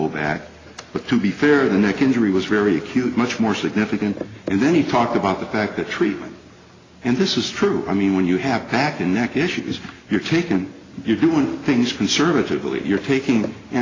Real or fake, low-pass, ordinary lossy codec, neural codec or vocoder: real; 7.2 kHz; AAC, 32 kbps; none